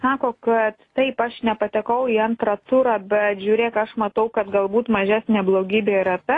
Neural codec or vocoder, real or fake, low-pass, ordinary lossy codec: none; real; 10.8 kHz; AAC, 32 kbps